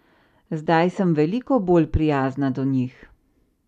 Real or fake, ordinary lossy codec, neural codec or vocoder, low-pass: real; none; none; 14.4 kHz